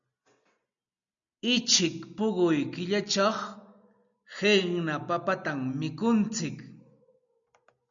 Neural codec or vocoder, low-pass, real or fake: none; 7.2 kHz; real